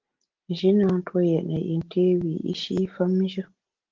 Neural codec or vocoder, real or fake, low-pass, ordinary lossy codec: none; real; 7.2 kHz; Opus, 16 kbps